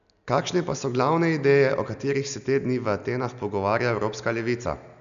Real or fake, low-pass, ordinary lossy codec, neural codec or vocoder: real; 7.2 kHz; none; none